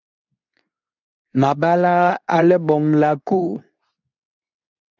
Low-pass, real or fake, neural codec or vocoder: 7.2 kHz; fake; codec, 24 kHz, 0.9 kbps, WavTokenizer, medium speech release version 2